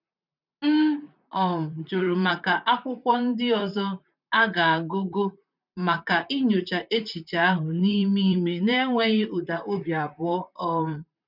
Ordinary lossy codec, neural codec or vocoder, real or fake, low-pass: none; vocoder, 44.1 kHz, 128 mel bands, Pupu-Vocoder; fake; 5.4 kHz